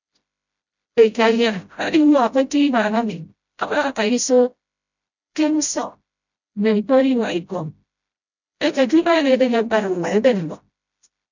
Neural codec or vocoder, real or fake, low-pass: codec, 16 kHz, 0.5 kbps, FreqCodec, smaller model; fake; 7.2 kHz